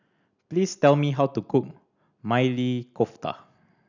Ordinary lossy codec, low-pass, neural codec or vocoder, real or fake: none; 7.2 kHz; none; real